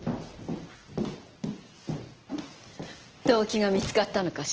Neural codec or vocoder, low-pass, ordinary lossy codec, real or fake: none; 7.2 kHz; Opus, 16 kbps; real